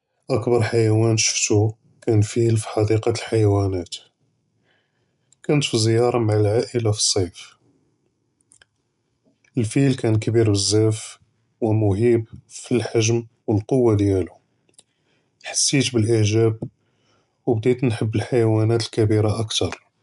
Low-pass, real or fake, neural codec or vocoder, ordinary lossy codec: 10.8 kHz; real; none; none